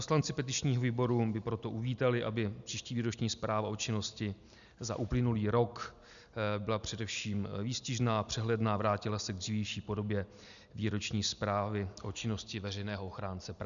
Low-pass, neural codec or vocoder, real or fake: 7.2 kHz; none; real